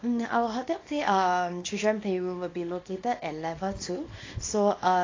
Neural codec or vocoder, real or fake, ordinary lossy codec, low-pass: codec, 24 kHz, 0.9 kbps, WavTokenizer, small release; fake; AAC, 32 kbps; 7.2 kHz